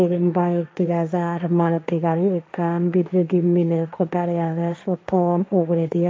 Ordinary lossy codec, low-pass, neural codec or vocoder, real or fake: none; none; codec, 16 kHz, 1.1 kbps, Voila-Tokenizer; fake